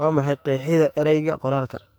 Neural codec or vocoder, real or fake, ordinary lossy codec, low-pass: codec, 44.1 kHz, 2.6 kbps, SNAC; fake; none; none